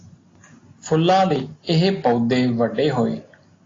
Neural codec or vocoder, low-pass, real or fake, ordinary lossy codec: none; 7.2 kHz; real; AAC, 32 kbps